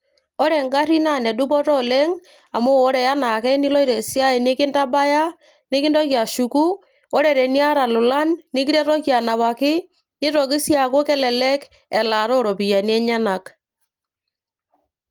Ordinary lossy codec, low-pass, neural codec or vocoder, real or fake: Opus, 32 kbps; 19.8 kHz; none; real